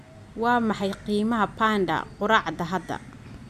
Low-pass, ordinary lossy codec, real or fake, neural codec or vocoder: 14.4 kHz; none; real; none